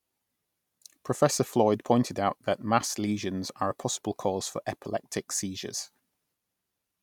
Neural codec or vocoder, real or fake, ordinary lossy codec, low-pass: none; real; none; 19.8 kHz